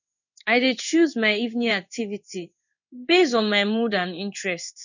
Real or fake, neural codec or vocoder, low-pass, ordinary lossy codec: fake; codec, 16 kHz in and 24 kHz out, 1 kbps, XY-Tokenizer; 7.2 kHz; none